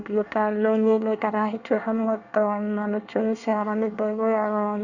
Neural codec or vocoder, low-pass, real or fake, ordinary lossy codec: codec, 24 kHz, 1 kbps, SNAC; 7.2 kHz; fake; none